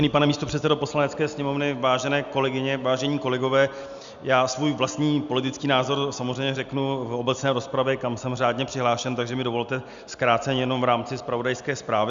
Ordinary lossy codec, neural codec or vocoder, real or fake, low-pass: Opus, 64 kbps; none; real; 7.2 kHz